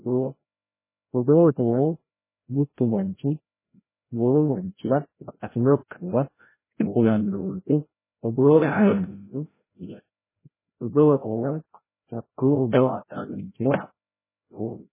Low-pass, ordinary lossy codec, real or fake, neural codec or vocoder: 3.6 kHz; MP3, 16 kbps; fake; codec, 16 kHz, 0.5 kbps, FreqCodec, larger model